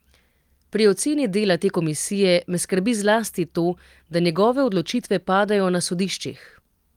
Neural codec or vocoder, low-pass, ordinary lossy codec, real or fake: none; 19.8 kHz; Opus, 32 kbps; real